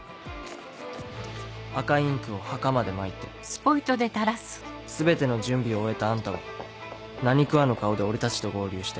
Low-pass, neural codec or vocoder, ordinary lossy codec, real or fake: none; none; none; real